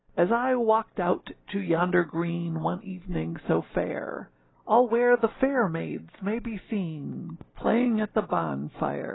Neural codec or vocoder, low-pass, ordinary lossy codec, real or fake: none; 7.2 kHz; AAC, 16 kbps; real